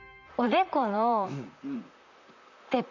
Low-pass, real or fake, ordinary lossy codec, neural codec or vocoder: 7.2 kHz; fake; none; vocoder, 44.1 kHz, 128 mel bands, Pupu-Vocoder